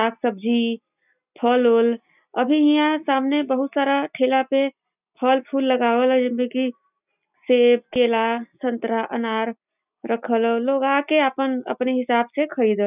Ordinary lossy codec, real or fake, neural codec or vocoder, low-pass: none; real; none; 3.6 kHz